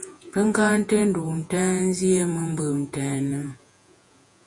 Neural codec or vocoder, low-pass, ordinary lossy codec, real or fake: vocoder, 48 kHz, 128 mel bands, Vocos; 10.8 kHz; MP3, 48 kbps; fake